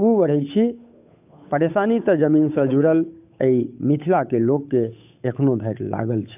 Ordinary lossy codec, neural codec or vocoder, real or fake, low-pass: AAC, 32 kbps; codec, 16 kHz, 8 kbps, FunCodec, trained on Chinese and English, 25 frames a second; fake; 3.6 kHz